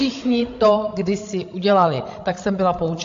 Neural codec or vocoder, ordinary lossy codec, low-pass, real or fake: codec, 16 kHz, 16 kbps, FreqCodec, larger model; AAC, 48 kbps; 7.2 kHz; fake